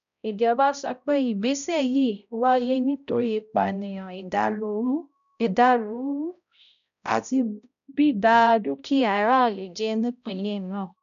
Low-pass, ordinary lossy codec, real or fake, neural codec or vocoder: 7.2 kHz; none; fake; codec, 16 kHz, 0.5 kbps, X-Codec, HuBERT features, trained on balanced general audio